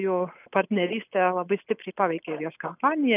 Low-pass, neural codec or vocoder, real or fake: 3.6 kHz; none; real